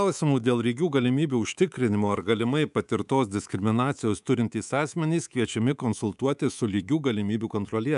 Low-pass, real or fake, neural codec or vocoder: 10.8 kHz; fake; codec, 24 kHz, 3.1 kbps, DualCodec